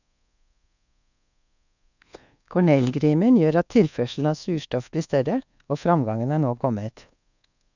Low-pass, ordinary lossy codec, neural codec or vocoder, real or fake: 7.2 kHz; none; codec, 24 kHz, 1.2 kbps, DualCodec; fake